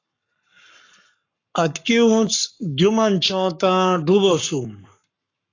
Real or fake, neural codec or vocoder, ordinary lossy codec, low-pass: fake; codec, 44.1 kHz, 7.8 kbps, Pupu-Codec; AAC, 48 kbps; 7.2 kHz